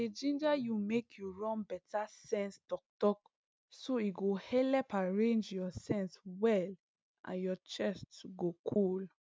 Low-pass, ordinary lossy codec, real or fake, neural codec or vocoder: none; none; real; none